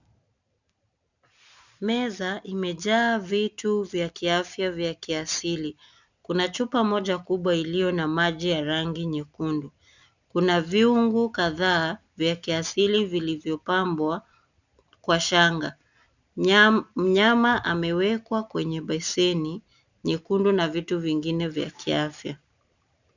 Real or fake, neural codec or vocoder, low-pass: real; none; 7.2 kHz